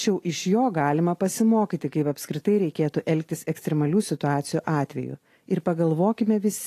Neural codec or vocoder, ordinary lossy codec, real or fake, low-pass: none; AAC, 48 kbps; real; 14.4 kHz